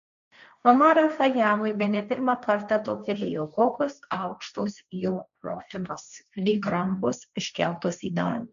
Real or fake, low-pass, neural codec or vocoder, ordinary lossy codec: fake; 7.2 kHz; codec, 16 kHz, 1.1 kbps, Voila-Tokenizer; MP3, 96 kbps